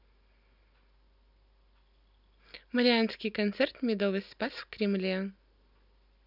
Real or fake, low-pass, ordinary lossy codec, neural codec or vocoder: real; 5.4 kHz; none; none